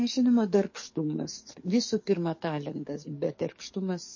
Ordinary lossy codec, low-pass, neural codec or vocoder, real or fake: MP3, 32 kbps; 7.2 kHz; codec, 44.1 kHz, 7.8 kbps, DAC; fake